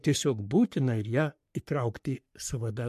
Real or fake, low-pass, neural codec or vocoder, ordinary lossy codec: fake; 14.4 kHz; codec, 44.1 kHz, 3.4 kbps, Pupu-Codec; MP3, 64 kbps